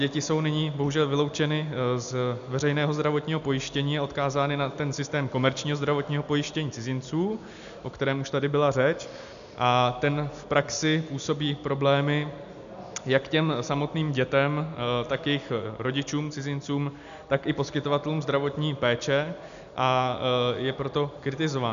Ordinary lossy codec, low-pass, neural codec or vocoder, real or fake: MP3, 96 kbps; 7.2 kHz; none; real